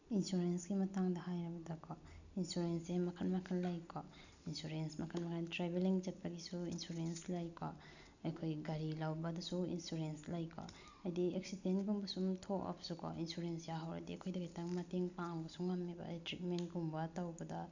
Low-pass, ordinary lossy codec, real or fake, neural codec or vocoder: 7.2 kHz; none; real; none